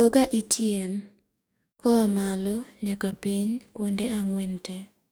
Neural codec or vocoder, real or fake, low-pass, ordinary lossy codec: codec, 44.1 kHz, 2.6 kbps, DAC; fake; none; none